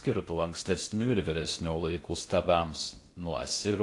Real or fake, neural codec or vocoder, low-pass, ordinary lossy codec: fake; codec, 16 kHz in and 24 kHz out, 0.6 kbps, FocalCodec, streaming, 2048 codes; 10.8 kHz; AAC, 48 kbps